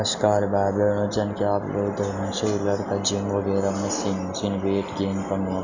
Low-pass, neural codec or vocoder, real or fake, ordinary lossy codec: 7.2 kHz; none; real; none